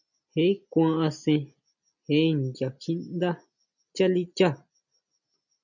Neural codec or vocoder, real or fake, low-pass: none; real; 7.2 kHz